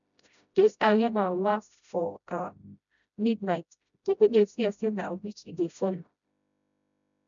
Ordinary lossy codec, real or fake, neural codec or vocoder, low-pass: none; fake; codec, 16 kHz, 0.5 kbps, FreqCodec, smaller model; 7.2 kHz